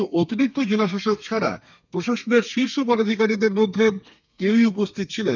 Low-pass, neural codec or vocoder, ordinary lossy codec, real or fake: 7.2 kHz; codec, 32 kHz, 1.9 kbps, SNAC; none; fake